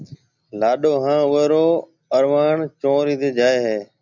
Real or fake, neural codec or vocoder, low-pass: real; none; 7.2 kHz